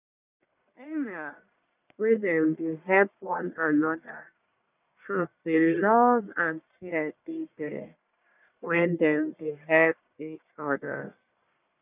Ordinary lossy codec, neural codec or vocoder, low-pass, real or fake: none; codec, 44.1 kHz, 1.7 kbps, Pupu-Codec; 3.6 kHz; fake